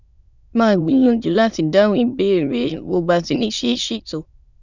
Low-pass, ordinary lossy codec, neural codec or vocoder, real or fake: 7.2 kHz; none; autoencoder, 22.05 kHz, a latent of 192 numbers a frame, VITS, trained on many speakers; fake